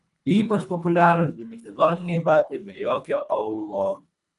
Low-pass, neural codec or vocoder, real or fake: 10.8 kHz; codec, 24 kHz, 1.5 kbps, HILCodec; fake